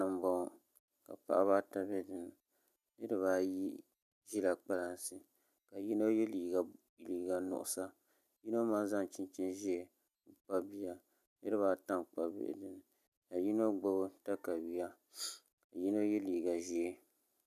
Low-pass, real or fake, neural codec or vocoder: 14.4 kHz; real; none